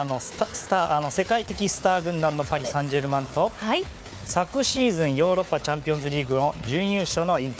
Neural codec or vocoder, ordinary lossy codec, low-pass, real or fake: codec, 16 kHz, 4 kbps, FunCodec, trained on Chinese and English, 50 frames a second; none; none; fake